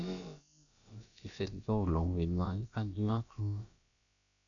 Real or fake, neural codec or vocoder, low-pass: fake; codec, 16 kHz, about 1 kbps, DyCAST, with the encoder's durations; 7.2 kHz